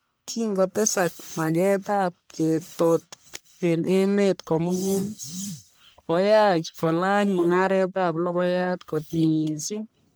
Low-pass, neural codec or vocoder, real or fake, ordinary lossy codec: none; codec, 44.1 kHz, 1.7 kbps, Pupu-Codec; fake; none